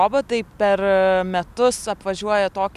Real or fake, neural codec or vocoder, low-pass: real; none; 14.4 kHz